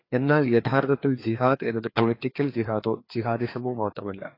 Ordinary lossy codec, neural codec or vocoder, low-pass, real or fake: AAC, 24 kbps; codec, 16 kHz, 2 kbps, FreqCodec, larger model; 5.4 kHz; fake